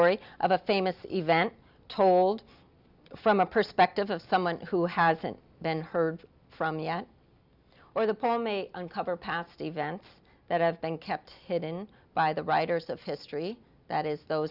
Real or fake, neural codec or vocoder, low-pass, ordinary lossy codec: real; none; 5.4 kHz; Opus, 64 kbps